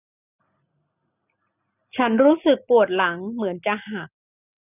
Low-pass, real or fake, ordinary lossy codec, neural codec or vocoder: 3.6 kHz; real; none; none